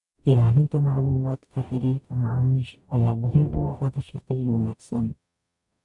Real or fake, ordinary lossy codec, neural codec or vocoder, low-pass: fake; none; codec, 44.1 kHz, 0.9 kbps, DAC; 10.8 kHz